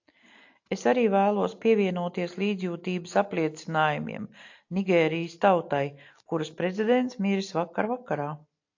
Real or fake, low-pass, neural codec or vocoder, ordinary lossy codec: real; 7.2 kHz; none; AAC, 48 kbps